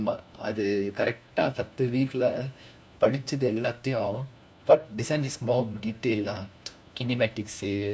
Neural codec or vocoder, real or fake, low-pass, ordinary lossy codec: codec, 16 kHz, 1 kbps, FunCodec, trained on LibriTTS, 50 frames a second; fake; none; none